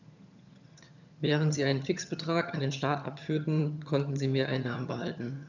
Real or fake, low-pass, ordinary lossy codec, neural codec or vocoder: fake; 7.2 kHz; none; vocoder, 22.05 kHz, 80 mel bands, HiFi-GAN